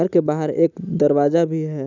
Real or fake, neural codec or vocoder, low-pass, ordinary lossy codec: real; none; 7.2 kHz; none